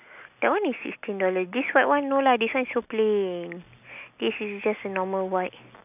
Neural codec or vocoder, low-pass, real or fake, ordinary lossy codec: none; 3.6 kHz; real; none